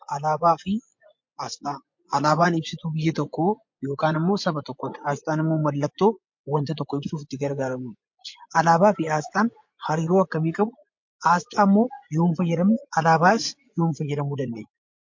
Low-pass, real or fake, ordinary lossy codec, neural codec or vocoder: 7.2 kHz; fake; MP3, 48 kbps; vocoder, 24 kHz, 100 mel bands, Vocos